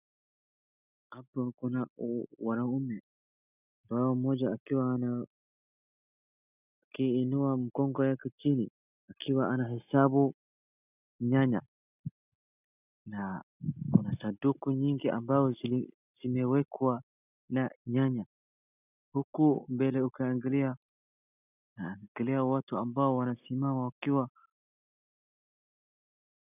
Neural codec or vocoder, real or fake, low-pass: none; real; 3.6 kHz